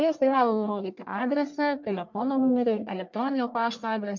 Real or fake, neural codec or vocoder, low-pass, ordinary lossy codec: fake; codec, 44.1 kHz, 1.7 kbps, Pupu-Codec; 7.2 kHz; MP3, 64 kbps